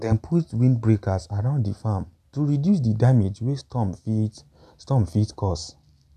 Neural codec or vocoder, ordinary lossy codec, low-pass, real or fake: none; none; 14.4 kHz; real